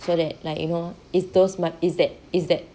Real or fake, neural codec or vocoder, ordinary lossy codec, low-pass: real; none; none; none